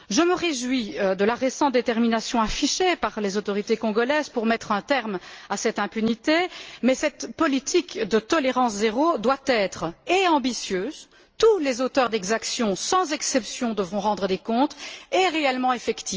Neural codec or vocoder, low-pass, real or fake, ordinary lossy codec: none; 7.2 kHz; real; Opus, 24 kbps